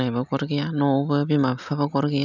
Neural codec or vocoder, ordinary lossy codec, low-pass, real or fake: none; none; 7.2 kHz; real